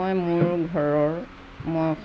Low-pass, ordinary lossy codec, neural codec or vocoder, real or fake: none; none; none; real